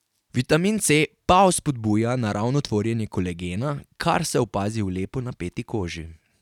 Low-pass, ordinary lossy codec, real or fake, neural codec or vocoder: 19.8 kHz; none; real; none